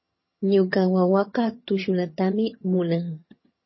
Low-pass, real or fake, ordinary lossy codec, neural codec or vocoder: 7.2 kHz; fake; MP3, 24 kbps; vocoder, 22.05 kHz, 80 mel bands, HiFi-GAN